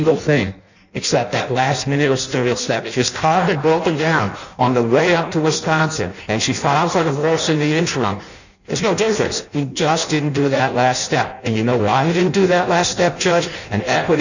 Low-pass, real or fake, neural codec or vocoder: 7.2 kHz; fake; codec, 16 kHz in and 24 kHz out, 0.6 kbps, FireRedTTS-2 codec